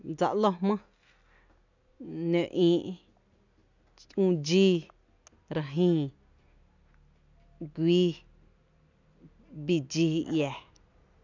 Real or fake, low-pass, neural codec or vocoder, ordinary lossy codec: real; 7.2 kHz; none; none